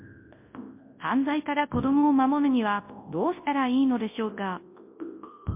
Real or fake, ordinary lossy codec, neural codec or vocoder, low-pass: fake; MP3, 24 kbps; codec, 24 kHz, 0.9 kbps, WavTokenizer, large speech release; 3.6 kHz